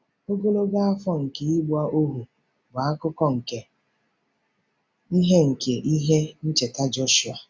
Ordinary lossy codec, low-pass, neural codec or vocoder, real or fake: none; none; none; real